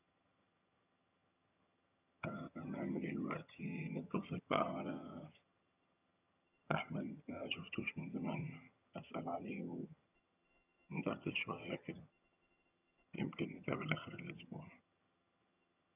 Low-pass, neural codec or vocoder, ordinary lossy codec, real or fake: 3.6 kHz; vocoder, 22.05 kHz, 80 mel bands, HiFi-GAN; none; fake